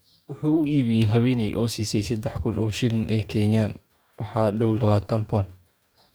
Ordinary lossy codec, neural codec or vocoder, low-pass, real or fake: none; codec, 44.1 kHz, 2.6 kbps, DAC; none; fake